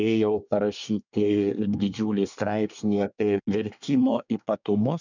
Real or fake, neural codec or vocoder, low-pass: fake; codec, 24 kHz, 1 kbps, SNAC; 7.2 kHz